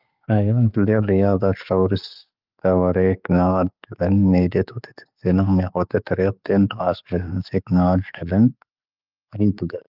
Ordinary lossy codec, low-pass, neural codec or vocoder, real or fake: Opus, 24 kbps; 5.4 kHz; none; real